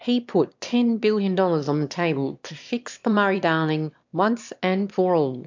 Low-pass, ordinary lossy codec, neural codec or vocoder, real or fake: 7.2 kHz; MP3, 64 kbps; autoencoder, 22.05 kHz, a latent of 192 numbers a frame, VITS, trained on one speaker; fake